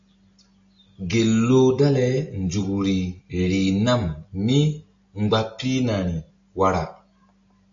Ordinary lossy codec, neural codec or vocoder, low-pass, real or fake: AAC, 64 kbps; none; 7.2 kHz; real